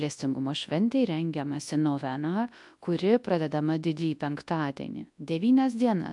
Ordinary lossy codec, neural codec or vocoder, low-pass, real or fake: AAC, 64 kbps; codec, 24 kHz, 0.9 kbps, WavTokenizer, large speech release; 10.8 kHz; fake